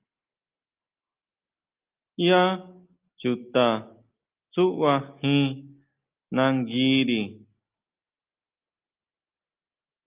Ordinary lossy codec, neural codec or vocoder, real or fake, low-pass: Opus, 32 kbps; none; real; 3.6 kHz